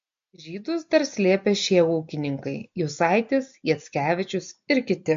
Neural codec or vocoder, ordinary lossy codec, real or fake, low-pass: none; MP3, 48 kbps; real; 7.2 kHz